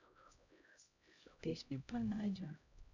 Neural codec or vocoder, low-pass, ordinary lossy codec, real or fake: codec, 16 kHz, 0.5 kbps, X-Codec, HuBERT features, trained on LibriSpeech; 7.2 kHz; none; fake